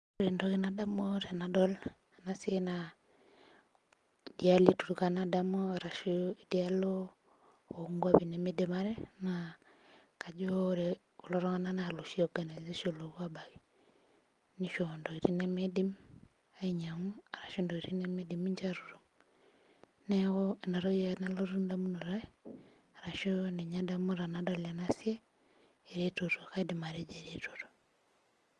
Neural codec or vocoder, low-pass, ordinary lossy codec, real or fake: none; 10.8 kHz; Opus, 24 kbps; real